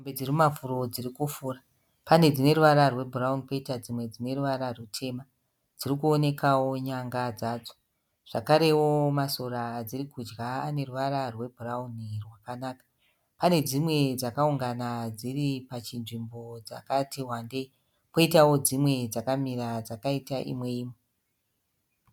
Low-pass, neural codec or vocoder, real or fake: 19.8 kHz; none; real